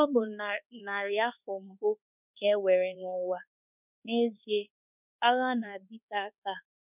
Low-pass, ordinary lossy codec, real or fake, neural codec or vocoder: 3.6 kHz; none; fake; codec, 24 kHz, 1.2 kbps, DualCodec